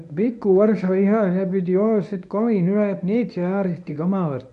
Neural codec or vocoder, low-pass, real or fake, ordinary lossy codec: codec, 24 kHz, 0.9 kbps, WavTokenizer, medium speech release version 1; 10.8 kHz; fake; none